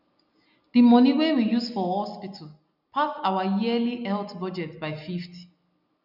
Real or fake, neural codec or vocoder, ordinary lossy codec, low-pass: real; none; none; 5.4 kHz